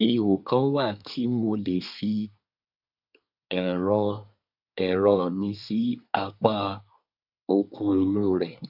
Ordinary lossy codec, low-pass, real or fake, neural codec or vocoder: none; 5.4 kHz; fake; codec, 24 kHz, 1 kbps, SNAC